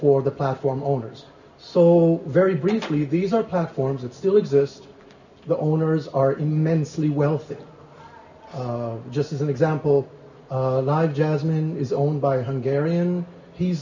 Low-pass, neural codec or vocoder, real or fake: 7.2 kHz; none; real